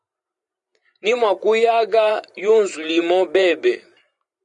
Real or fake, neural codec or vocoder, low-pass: fake; vocoder, 44.1 kHz, 128 mel bands every 512 samples, BigVGAN v2; 10.8 kHz